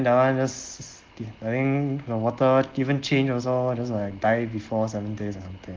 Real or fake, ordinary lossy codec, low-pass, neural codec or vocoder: real; Opus, 16 kbps; 7.2 kHz; none